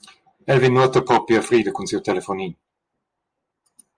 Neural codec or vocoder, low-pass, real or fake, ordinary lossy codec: none; 9.9 kHz; real; Opus, 24 kbps